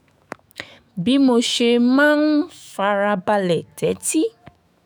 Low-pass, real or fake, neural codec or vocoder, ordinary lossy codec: none; fake; autoencoder, 48 kHz, 128 numbers a frame, DAC-VAE, trained on Japanese speech; none